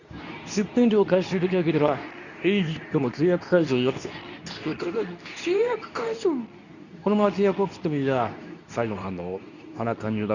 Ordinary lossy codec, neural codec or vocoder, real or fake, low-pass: none; codec, 24 kHz, 0.9 kbps, WavTokenizer, medium speech release version 2; fake; 7.2 kHz